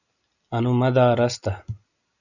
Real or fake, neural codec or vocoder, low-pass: real; none; 7.2 kHz